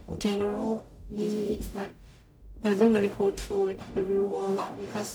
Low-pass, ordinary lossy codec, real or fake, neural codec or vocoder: none; none; fake; codec, 44.1 kHz, 0.9 kbps, DAC